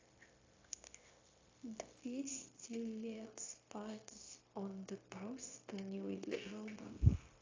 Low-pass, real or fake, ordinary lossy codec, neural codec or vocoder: 7.2 kHz; fake; AAC, 32 kbps; codec, 16 kHz, 0.9 kbps, LongCat-Audio-Codec